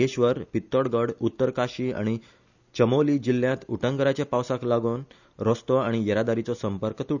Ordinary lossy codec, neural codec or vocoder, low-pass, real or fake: none; none; 7.2 kHz; real